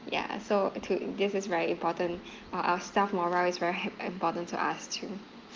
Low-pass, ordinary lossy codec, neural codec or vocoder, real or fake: 7.2 kHz; Opus, 32 kbps; none; real